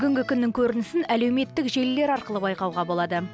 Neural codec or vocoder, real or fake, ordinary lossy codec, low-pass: none; real; none; none